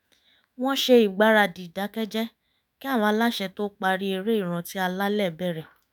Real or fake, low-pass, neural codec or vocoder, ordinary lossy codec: fake; none; autoencoder, 48 kHz, 128 numbers a frame, DAC-VAE, trained on Japanese speech; none